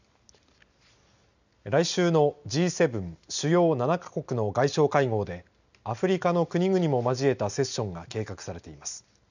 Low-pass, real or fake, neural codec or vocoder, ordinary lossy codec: 7.2 kHz; real; none; none